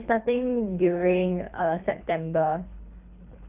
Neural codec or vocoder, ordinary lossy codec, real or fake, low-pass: codec, 24 kHz, 3 kbps, HILCodec; none; fake; 3.6 kHz